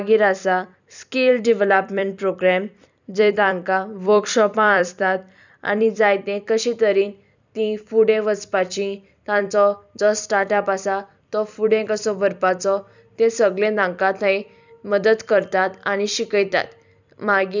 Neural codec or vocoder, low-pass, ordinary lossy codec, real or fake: vocoder, 44.1 kHz, 128 mel bands every 256 samples, BigVGAN v2; 7.2 kHz; none; fake